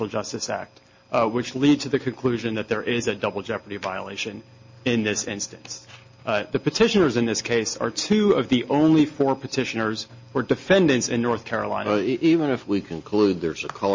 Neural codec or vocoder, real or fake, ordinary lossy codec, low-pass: none; real; MP3, 32 kbps; 7.2 kHz